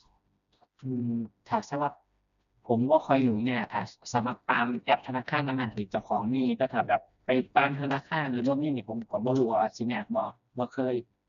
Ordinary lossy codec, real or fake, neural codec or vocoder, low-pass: none; fake; codec, 16 kHz, 1 kbps, FreqCodec, smaller model; 7.2 kHz